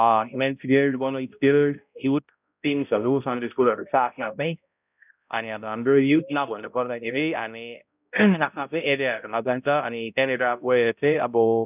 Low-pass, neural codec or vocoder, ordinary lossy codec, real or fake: 3.6 kHz; codec, 16 kHz, 0.5 kbps, X-Codec, HuBERT features, trained on balanced general audio; none; fake